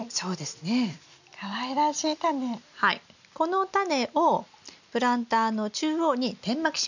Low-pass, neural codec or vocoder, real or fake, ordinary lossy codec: 7.2 kHz; none; real; none